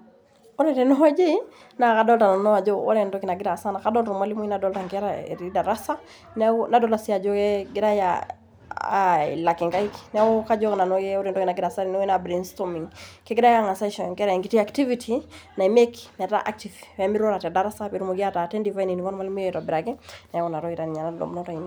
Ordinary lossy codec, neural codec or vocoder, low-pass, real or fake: none; none; none; real